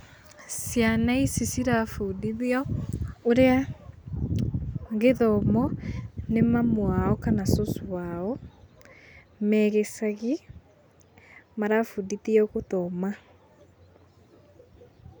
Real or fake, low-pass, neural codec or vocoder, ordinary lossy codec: real; none; none; none